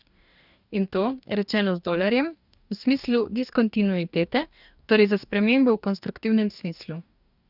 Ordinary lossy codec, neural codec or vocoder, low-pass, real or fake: none; codec, 44.1 kHz, 2.6 kbps, DAC; 5.4 kHz; fake